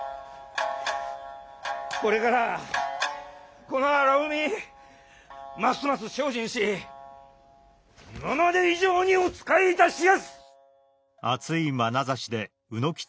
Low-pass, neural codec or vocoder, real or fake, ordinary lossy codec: none; none; real; none